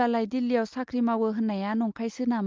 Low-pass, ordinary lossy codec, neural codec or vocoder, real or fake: 7.2 kHz; Opus, 32 kbps; none; real